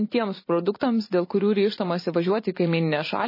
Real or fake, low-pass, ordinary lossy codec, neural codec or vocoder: fake; 5.4 kHz; MP3, 24 kbps; vocoder, 44.1 kHz, 128 mel bands every 512 samples, BigVGAN v2